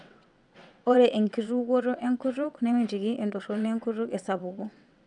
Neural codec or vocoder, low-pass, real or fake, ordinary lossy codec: vocoder, 22.05 kHz, 80 mel bands, WaveNeXt; 9.9 kHz; fake; none